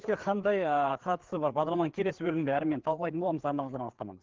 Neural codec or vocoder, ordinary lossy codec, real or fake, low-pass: codec, 16 kHz, 4 kbps, FreqCodec, larger model; Opus, 16 kbps; fake; 7.2 kHz